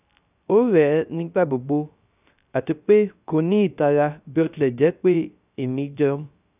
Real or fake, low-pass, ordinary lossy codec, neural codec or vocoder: fake; 3.6 kHz; none; codec, 16 kHz, 0.3 kbps, FocalCodec